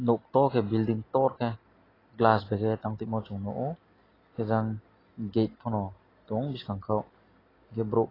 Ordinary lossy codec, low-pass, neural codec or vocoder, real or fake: AAC, 24 kbps; 5.4 kHz; none; real